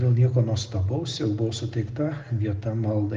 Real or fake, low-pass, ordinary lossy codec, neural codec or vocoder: real; 7.2 kHz; Opus, 16 kbps; none